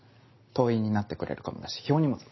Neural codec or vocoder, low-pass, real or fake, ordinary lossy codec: none; 7.2 kHz; real; MP3, 24 kbps